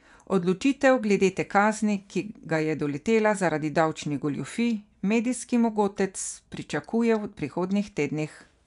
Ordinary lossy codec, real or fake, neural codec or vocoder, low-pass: none; fake; vocoder, 24 kHz, 100 mel bands, Vocos; 10.8 kHz